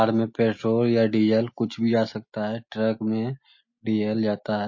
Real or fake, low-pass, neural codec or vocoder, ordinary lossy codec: real; 7.2 kHz; none; MP3, 32 kbps